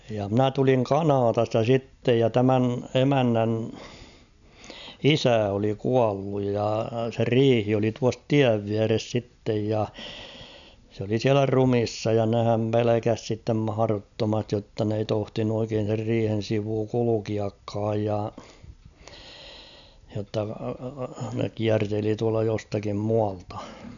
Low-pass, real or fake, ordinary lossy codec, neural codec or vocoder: 7.2 kHz; real; none; none